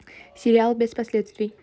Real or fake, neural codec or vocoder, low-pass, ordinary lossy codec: real; none; none; none